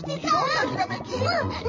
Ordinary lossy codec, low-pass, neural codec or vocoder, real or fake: MP3, 32 kbps; 7.2 kHz; vocoder, 44.1 kHz, 80 mel bands, Vocos; fake